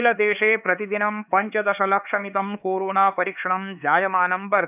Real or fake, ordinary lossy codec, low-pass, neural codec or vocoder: fake; none; 3.6 kHz; codec, 16 kHz, 4 kbps, X-Codec, HuBERT features, trained on LibriSpeech